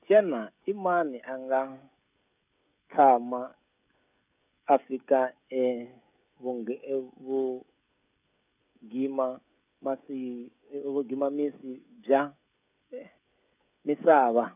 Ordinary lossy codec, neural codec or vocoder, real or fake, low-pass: none; codec, 16 kHz, 16 kbps, FreqCodec, smaller model; fake; 3.6 kHz